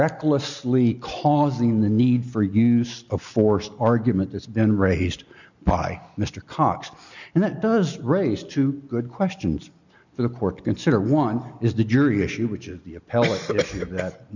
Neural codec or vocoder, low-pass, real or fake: none; 7.2 kHz; real